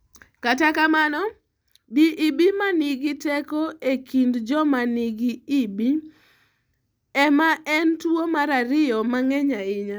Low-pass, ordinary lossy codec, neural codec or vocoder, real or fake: none; none; none; real